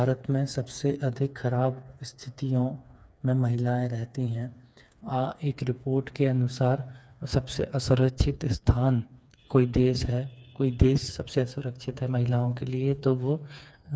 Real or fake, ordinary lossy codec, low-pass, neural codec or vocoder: fake; none; none; codec, 16 kHz, 4 kbps, FreqCodec, smaller model